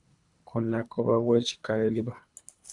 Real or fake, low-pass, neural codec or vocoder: fake; 10.8 kHz; codec, 24 kHz, 3 kbps, HILCodec